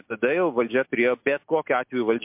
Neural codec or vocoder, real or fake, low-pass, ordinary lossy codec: none; real; 3.6 kHz; MP3, 32 kbps